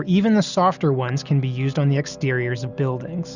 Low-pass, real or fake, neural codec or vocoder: 7.2 kHz; real; none